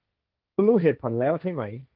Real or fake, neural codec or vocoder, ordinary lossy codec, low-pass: fake; codec, 16 kHz, 1.1 kbps, Voila-Tokenizer; Opus, 32 kbps; 5.4 kHz